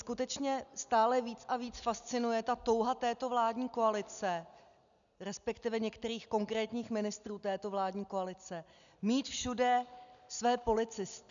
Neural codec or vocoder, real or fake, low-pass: none; real; 7.2 kHz